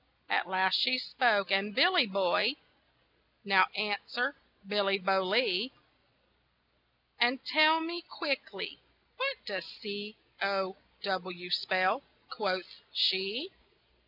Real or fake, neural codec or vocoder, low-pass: real; none; 5.4 kHz